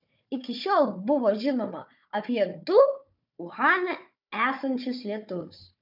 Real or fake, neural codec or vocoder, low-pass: fake; codec, 16 kHz, 4 kbps, FunCodec, trained on Chinese and English, 50 frames a second; 5.4 kHz